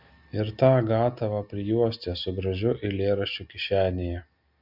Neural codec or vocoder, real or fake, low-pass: none; real; 5.4 kHz